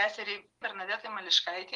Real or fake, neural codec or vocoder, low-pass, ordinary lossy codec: real; none; 10.8 kHz; Opus, 32 kbps